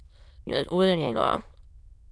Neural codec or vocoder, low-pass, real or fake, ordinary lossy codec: autoencoder, 22.05 kHz, a latent of 192 numbers a frame, VITS, trained on many speakers; none; fake; none